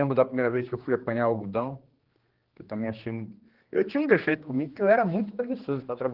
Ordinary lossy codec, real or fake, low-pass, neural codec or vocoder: Opus, 16 kbps; fake; 5.4 kHz; codec, 16 kHz, 2 kbps, X-Codec, HuBERT features, trained on general audio